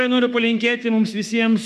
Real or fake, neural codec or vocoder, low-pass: fake; autoencoder, 48 kHz, 32 numbers a frame, DAC-VAE, trained on Japanese speech; 14.4 kHz